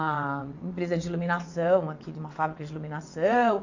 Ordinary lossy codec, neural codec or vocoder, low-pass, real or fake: AAC, 48 kbps; vocoder, 22.05 kHz, 80 mel bands, WaveNeXt; 7.2 kHz; fake